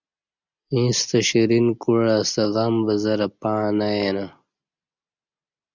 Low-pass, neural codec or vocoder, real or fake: 7.2 kHz; none; real